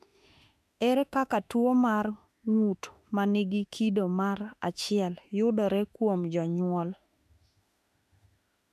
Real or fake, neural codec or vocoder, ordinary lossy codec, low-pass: fake; autoencoder, 48 kHz, 32 numbers a frame, DAC-VAE, trained on Japanese speech; MP3, 96 kbps; 14.4 kHz